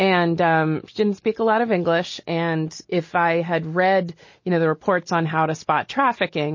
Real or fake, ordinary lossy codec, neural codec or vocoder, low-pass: real; MP3, 32 kbps; none; 7.2 kHz